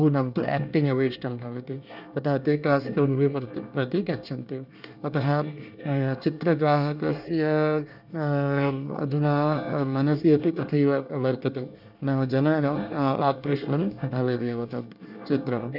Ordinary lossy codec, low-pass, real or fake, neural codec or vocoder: none; 5.4 kHz; fake; codec, 24 kHz, 1 kbps, SNAC